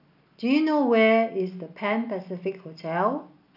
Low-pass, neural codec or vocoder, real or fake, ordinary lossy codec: 5.4 kHz; none; real; none